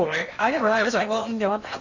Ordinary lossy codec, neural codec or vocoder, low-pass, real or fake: none; codec, 16 kHz in and 24 kHz out, 0.8 kbps, FocalCodec, streaming, 65536 codes; 7.2 kHz; fake